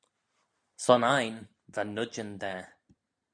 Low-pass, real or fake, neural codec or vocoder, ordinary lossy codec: 9.9 kHz; real; none; AAC, 64 kbps